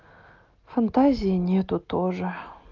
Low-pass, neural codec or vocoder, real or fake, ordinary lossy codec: 7.2 kHz; none; real; Opus, 24 kbps